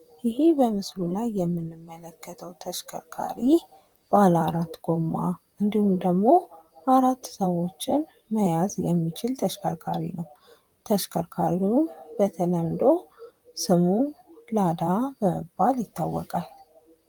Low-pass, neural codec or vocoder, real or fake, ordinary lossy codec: 19.8 kHz; vocoder, 44.1 kHz, 128 mel bands, Pupu-Vocoder; fake; Opus, 32 kbps